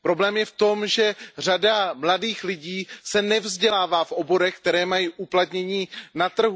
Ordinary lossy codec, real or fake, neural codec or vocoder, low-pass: none; real; none; none